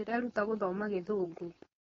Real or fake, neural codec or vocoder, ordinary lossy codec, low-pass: fake; codec, 16 kHz, 4.8 kbps, FACodec; AAC, 24 kbps; 7.2 kHz